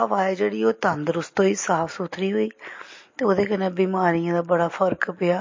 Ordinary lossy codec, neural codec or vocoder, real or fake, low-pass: MP3, 32 kbps; none; real; 7.2 kHz